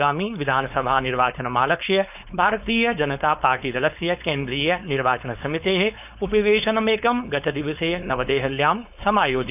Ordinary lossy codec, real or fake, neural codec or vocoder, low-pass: none; fake; codec, 16 kHz, 4.8 kbps, FACodec; 3.6 kHz